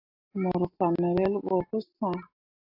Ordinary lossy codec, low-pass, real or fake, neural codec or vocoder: AAC, 48 kbps; 5.4 kHz; real; none